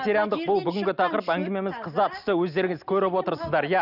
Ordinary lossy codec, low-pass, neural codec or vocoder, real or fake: none; 5.4 kHz; none; real